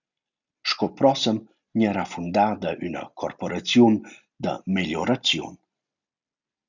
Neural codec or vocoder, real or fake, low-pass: none; real; 7.2 kHz